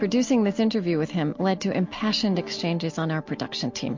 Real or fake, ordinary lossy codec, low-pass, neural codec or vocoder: real; MP3, 48 kbps; 7.2 kHz; none